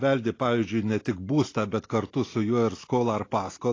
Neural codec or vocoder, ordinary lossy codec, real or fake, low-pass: none; AAC, 32 kbps; real; 7.2 kHz